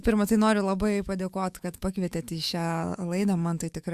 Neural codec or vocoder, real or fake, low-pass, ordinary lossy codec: autoencoder, 48 kHz, 128 numbers a frame, DAC-VAE, trained on Japanese speech; fake; 14.4 kHz; Opus, 64 kbps